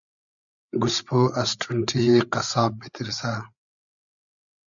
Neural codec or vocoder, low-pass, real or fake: codec, 16 kHz, 8 kbps, FreqCodec, larger model; 7.2 kHz; fake